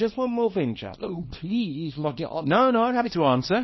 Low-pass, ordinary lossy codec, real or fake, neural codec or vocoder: 7.2 kHz; MP3, 24 kbps; fake; codec, 24 kHz, 0.9 kbps, WavTokenizer, small release